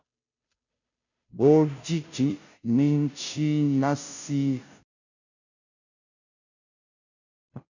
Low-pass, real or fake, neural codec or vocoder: 7.2 kHz; fake; codec, 16 kHz, 0.5 kbps, FunCodec, trained on Chinese and English, 25 frames a second